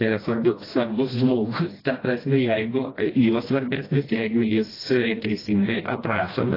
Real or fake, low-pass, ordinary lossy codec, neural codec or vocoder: fake; 5.4 kHz; AAC, 24 kbps; codec, 16 kHz, 1 kbps, FreqCodec, smaller model